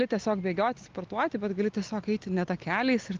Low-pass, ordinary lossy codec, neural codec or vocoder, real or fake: 7.2 kHz; Opus, 24 kbps; none; real